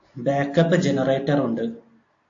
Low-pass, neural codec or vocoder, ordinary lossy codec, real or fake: 7.2 kHz; none; AAC, 48 kbps; real